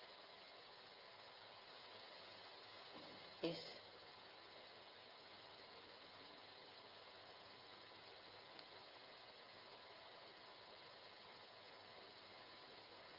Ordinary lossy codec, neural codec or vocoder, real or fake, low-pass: Opus, 32 kbps; codec, 16 kHz, 16 kbps, FreqCodec, smaller model; fake; 5.4 kHz